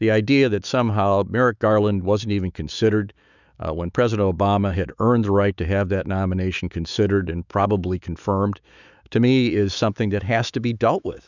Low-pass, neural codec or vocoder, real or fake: 7.2 kHz; autoencoder, 48 kHz, 128 numbers a frame, DAC-VAE, trained on Japanese speech; fake